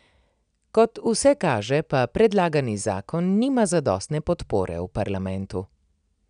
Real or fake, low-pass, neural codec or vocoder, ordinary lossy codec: real; 9.9 kHz; none; none